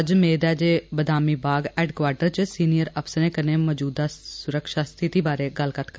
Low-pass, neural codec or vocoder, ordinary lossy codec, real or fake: none; none; none; real